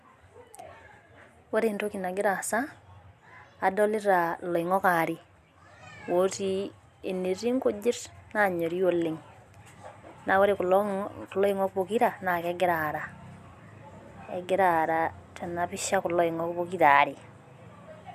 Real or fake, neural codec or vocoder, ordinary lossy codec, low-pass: real; none; none; 14.4 kHz